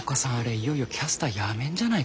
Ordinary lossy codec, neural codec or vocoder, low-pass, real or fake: none; none; none; real